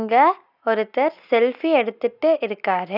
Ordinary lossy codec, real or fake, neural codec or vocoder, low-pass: none; real; none; 5.4 kHz